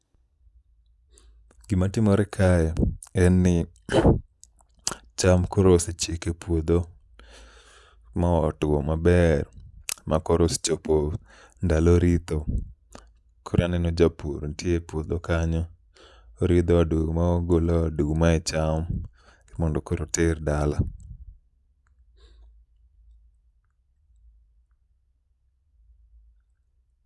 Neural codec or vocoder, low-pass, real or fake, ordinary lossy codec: none; none; real; none